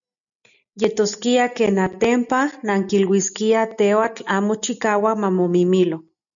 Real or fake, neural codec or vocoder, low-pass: real; none; 7.2 kHz